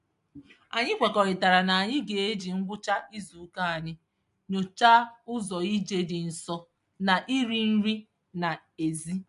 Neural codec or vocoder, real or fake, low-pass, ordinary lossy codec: none; real; 10.8 kHz; MP3, 64 kbps